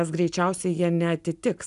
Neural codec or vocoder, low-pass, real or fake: none; 10.8 kHz; real